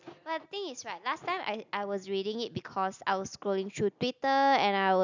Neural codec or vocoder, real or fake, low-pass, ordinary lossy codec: none; real; 7.2 kHz; none